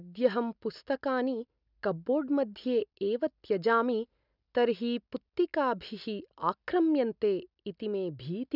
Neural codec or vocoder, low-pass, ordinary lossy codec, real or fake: none; 5.4 kHz; none; real